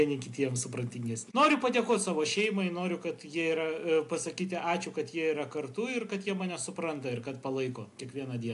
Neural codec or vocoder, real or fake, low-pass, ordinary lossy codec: none; real; 10.8 kHz; AAC, 64 kbps